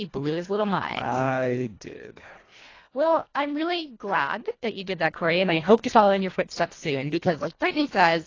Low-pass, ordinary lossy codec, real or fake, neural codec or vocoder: 7.2 kHz; AAC, 32 kbps; fake; codec, 24 kHz, 1.5 kbps, HILCodec